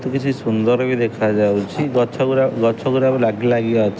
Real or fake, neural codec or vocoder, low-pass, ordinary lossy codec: real; none; none; none